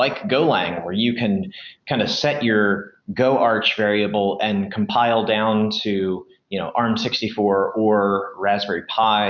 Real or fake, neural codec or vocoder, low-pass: real; none; 7.2 kHz